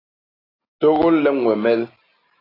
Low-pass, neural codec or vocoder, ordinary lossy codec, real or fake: 5.4 kHz; none; AAC, 24 kbps; real